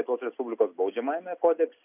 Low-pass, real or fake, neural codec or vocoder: 3.6 kHz; real; none